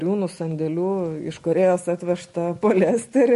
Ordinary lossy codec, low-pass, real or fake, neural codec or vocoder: MP3, 48 kbps; 14.4 kHz; real; none